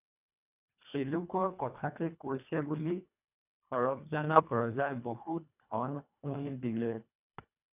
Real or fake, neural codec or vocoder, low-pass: fake; codec, 24 kHz, 1.5 kbps, HILCodec; 3.6 kHz